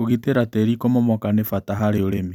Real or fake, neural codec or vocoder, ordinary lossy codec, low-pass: fake; vocoder, 44.1 kHz, 128 mel bands every 256 samples, BigVGAN v2; none; 19.8 kHz